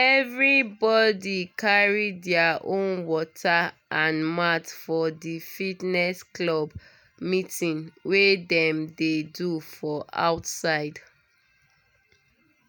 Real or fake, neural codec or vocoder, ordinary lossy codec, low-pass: real; none; none; none